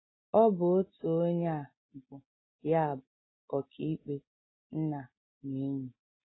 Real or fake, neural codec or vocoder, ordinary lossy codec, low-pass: real; none; AAC, 16 kbps; 7.2 kHz